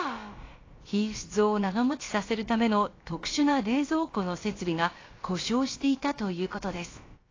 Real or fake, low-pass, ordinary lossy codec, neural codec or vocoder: fake; 7.2 kHz; AAC, 32 kbps; codec, 16 kHz, about 1 kbps, DyCAST, with the encoder's durations